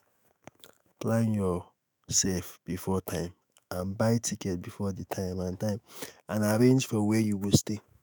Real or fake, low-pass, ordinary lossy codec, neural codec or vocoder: fake; none; none; autoencoder, 48 kHz, 128 numbers a frame, DAC-VAE, trained on Japanese speech